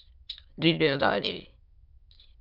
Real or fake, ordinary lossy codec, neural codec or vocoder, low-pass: fake; MP3, 48 kbps; autoencoder, 22.05 kHz, a latent of 192 numbers a frame, VITS, trained on many speakers; 5.4 kHz